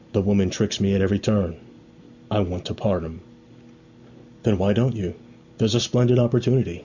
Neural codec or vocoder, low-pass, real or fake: none; 7.2 kHz; real